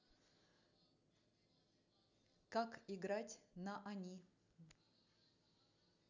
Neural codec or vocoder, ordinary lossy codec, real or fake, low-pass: none; none; real; 7.2 kHz